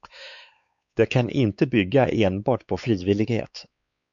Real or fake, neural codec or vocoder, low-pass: fake; codec, 16 kHz, 4 kbps, X-Codec, WavLM features, trained on Multilingual LibriSpeech; 7.2 kHz